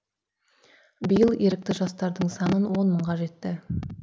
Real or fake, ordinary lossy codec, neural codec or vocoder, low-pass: real; none; none; none